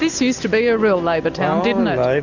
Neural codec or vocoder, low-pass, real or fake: none; 7.2 kHz; real